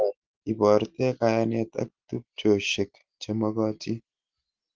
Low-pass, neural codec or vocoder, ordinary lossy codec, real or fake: 7.2 kHz; none; Opus, 16 kbps; real